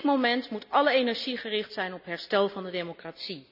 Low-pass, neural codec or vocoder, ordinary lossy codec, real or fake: 5.4 kHz; none; none; real